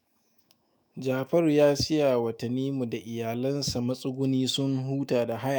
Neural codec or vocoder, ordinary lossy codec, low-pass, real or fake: autoencoder, 48 kHz, 128 numbers a frame, DAC-VAE, trained on Japanese speech; none; none; fake